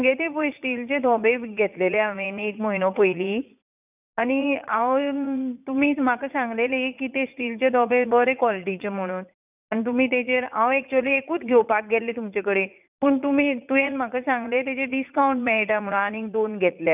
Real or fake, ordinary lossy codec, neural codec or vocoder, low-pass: fake; none; vocoder, 44.1 kHz, 80 mel bands, Vocos; 3.6 kHz